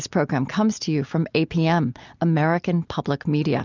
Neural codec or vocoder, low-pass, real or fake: none; 7.2 kHz; real